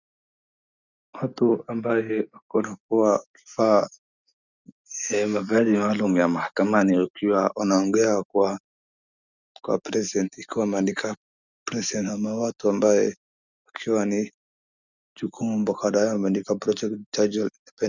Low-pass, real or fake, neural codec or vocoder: 7.2 kHz; real; none